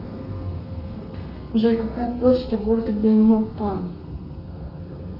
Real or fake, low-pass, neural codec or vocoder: fake; 5.4 kHz; codec, 32 kHz, 1.9 kbps, SNAC